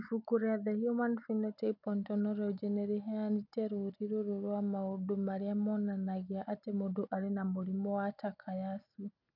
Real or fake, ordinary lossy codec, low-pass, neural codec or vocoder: real; none; 5.4 kHz; none